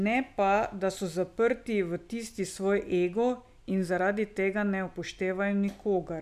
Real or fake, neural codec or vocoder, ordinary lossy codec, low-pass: real; none; none; 14.4 kHz